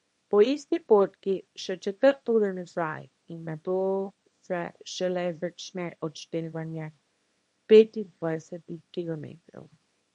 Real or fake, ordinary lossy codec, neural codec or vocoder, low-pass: fake; MP3, 48 kbps; codec, 24 kHz, 0.9 kbps, WavTokenizer, small release; 10.8 kHz